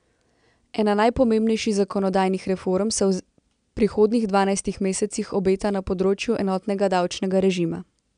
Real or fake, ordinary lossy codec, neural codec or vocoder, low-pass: real; none; none; 9.9 kHz